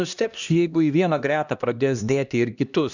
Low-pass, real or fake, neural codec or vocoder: 7.2 kHz; fake; codec, 16 kHz, 1 kbps, X-Codec, HuBERT features, trained on LibriSpeech